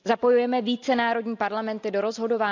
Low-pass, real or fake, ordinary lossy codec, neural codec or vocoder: 7.2 kHz; real; none; none